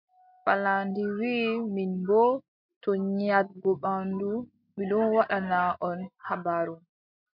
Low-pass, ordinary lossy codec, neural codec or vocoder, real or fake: 5.4 kHz; MP3, 48 kbps; none; real